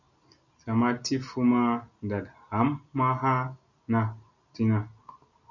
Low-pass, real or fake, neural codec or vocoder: 7.2 kHz; real; none